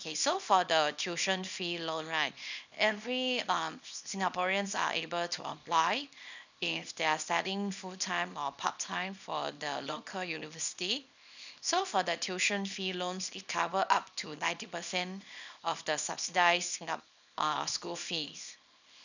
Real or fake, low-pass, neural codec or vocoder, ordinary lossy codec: fake; 7.2 kHz; codec, 24 kHz, 0.9 kbps, WavTokenizer, small release; none